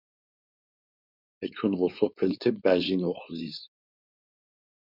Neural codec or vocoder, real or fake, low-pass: codec, 16 kHz, 4.8 kbps, FACodec; fake; 5.4 kHz